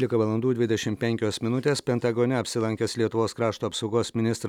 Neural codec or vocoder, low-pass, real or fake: none; 19.8 kHz; real